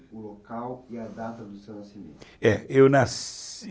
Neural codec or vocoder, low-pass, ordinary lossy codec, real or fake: none; none; none; real